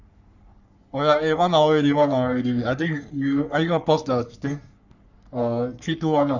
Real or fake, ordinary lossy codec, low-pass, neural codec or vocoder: fake; none; 7.2 kHz; codec, 44.1 kHz, 3.4 kbps, Pupu-Codec